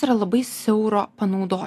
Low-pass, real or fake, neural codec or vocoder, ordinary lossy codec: 14.4 kHz; real; none; AAC, 64 kbps